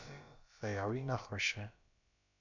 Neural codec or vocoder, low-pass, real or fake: codec, 16 kHz, about 1 kbps, DyCAST, with the encoder's durations; 7.2 kHz; fake